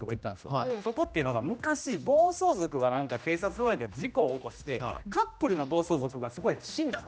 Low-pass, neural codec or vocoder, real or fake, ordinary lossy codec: none; codec, 16 kHz, 1 kbps, X-Codec, HuBERT features, trained on general audio; fake; none